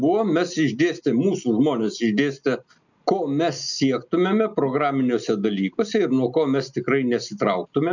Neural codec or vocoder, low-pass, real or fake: none; 7.2 kHz; real